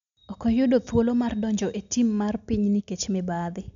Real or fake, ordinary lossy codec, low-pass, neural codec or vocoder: real; none; 7.2 kHz; none